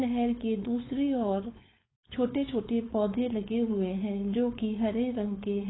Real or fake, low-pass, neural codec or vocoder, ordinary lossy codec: fake; 7.2 kHz; codec, 16 kHz, 4.8 kbps, FACodec; AAC, 16 kbps